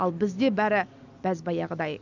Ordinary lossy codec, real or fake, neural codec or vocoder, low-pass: none; real; none; 7.2 kHz